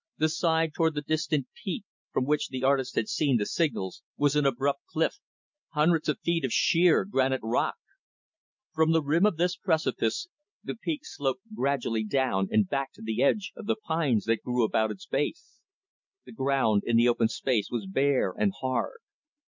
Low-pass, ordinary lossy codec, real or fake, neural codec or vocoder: 7.2 kHz; MP3, 64 kbps; real; none